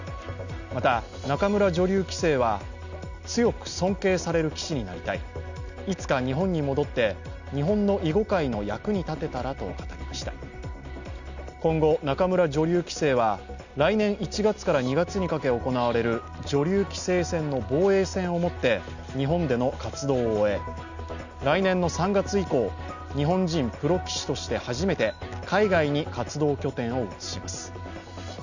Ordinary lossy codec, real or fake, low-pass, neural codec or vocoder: none; real; 7.2 kHz; none